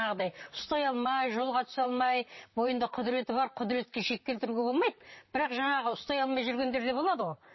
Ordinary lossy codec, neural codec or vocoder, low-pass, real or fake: MP3, 24 kbps; vocoder, 44.1 kHz, 128 mel bands, Pupu-Vocoder; 7.2 kHz; fake